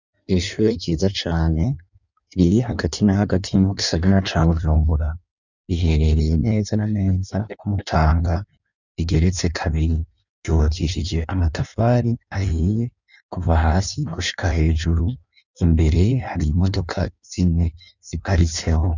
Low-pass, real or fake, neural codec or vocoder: 7.2 kHz; fake; codec, 16 kHz in and 24 kHz out, 1.1 kbps, FireRedTTS-2 codec